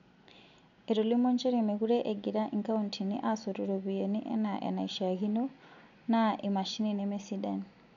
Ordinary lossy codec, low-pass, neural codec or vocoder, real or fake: none; 7.2 kHz; none; real